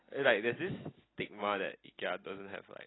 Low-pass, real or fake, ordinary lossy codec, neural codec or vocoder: 7.2 kHz; real; AAC, 16 kbps; none